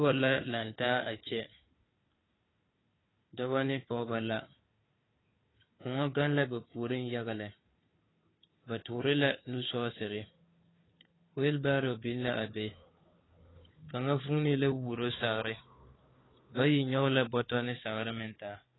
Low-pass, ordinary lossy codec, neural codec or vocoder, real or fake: 7.2 kHz; AAC, 16 kbps; codec, 16 kHz, 4 kbps, FunCodec, trained on Chinese and English, 50 frames a second; fake